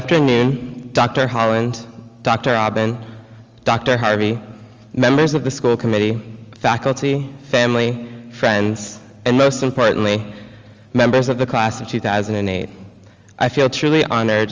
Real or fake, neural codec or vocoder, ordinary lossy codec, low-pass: real; none; Opus, 32 kbps; 7.2 kHz